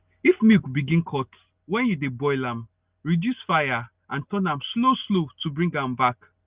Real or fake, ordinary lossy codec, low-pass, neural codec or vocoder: real; Opus, 24 kbps; 3.6 kHz; none